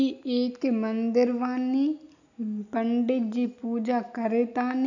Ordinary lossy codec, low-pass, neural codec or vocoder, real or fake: none; 7.2 kHz; none; real